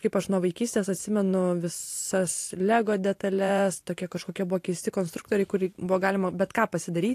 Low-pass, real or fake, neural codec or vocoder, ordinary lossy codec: 14.4 kHz; fake; vocoder, 44.1 kHz, 128 mel bands every 512 samples, BigVGAN v2; AAC, 64 kbps